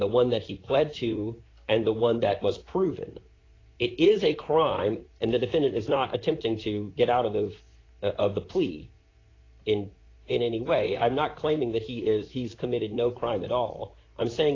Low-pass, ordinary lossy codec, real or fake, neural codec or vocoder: 7.2 kHz; AAC, 32 kbps; fake; vocoder, 44.1 kHz, 128 mel bands, Pupu-Vocoder